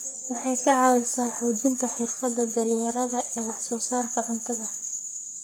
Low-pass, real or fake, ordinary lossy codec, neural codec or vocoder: none; fake; none; codec, 44.1 kHz, 3.4 kbps, Pupu-Codec